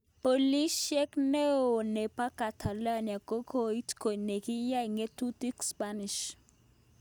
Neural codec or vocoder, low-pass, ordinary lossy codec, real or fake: none; none; none; real